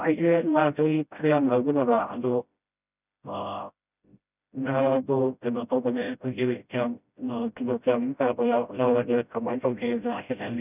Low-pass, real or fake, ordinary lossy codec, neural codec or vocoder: 3.6 kHz; fake; none; codec, 16 kHz, 0.5 kbps, FreqCodec, smaller model